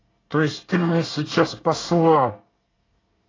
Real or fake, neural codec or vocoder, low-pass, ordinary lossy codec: fake; codec, 24 kHz, 1 kbps, SNAC; 7.2 kHz; AAC, 32 kbps